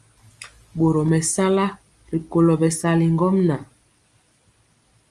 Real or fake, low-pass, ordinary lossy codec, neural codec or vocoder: real; 10.8 kHz; Opus, 32 kbps; none